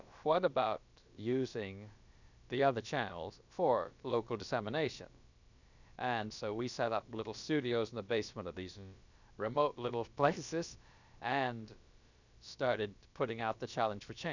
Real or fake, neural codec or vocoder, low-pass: fake; codec, 16 kHz, about 1 kbps, DyCAST, with the encoder's durations; 7.2 kHz